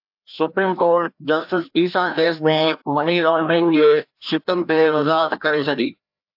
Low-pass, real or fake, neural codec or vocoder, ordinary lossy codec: 5.4 kHz; fake; codec, 16 kHz, 1 kbps, FreqCodec, larger model; AAC, 48 kbps